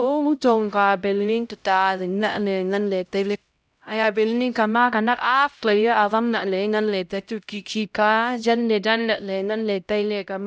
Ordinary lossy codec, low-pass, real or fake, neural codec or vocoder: none; none; fake; codec, 16 kHz, 0.5 kbps, X-Codec, HuBERT features, trained on LibriSpeech